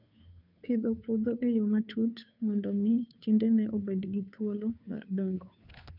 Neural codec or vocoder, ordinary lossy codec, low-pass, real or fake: codec, 16 kHz, 2 kbps, FunCodec, trained on Chinese and English, 25 frames a second; none; 5.4 kHz; fake